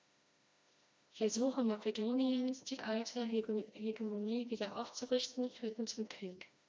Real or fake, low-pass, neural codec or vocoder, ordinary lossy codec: fake; none; codec, 16 kHz, 1 kbps, FreqCodec, smaller model; none